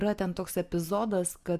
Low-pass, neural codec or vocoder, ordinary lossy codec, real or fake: 14.4 kHz; none; Opus, 64 kbps; real